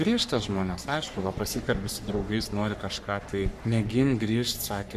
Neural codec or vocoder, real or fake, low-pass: codec, 44.1 kHz, 3.4 kbps, Pupu-Codec; fake; 14.4 kHz